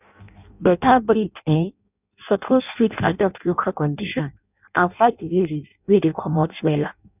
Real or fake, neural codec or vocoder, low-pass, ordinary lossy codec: fake; codec, 16 kHz in and 24 kHz out, 0.6 kbps, FireRedTTS-2 codec; 3.6 kHz; none